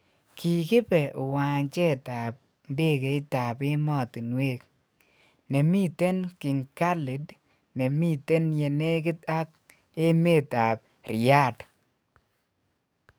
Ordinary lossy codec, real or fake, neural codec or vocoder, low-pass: none; fake; codec, 44.1 kHz, 7.8 kbps, DAC; none